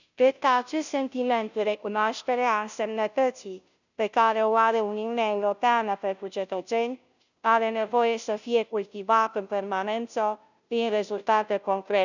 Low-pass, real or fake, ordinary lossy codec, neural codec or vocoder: 7.2 kHz; fake; none; codec, 16 kHz, 0.5 kbps, FunCodec, trained on Chinese and English, 25 frames a second